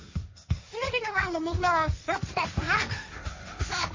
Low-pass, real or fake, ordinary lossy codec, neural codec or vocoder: 7.2 kHz; fake; MP3, 32 kbps; codec, 16 kHz, 1.1 kbps, Voila-Tokenizer